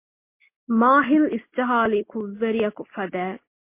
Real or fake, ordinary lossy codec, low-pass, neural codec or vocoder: real; MP3, 24 kbps; 3.6 kHz; none